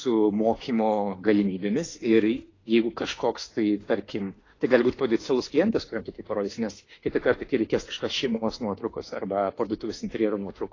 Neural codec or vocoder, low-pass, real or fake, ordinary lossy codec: autoencoder, 48 kHz, 32 numbers a frame, DAC-VAE, trained on Japanese speech; 7.2 kHz; fake; AAC, 32 kbps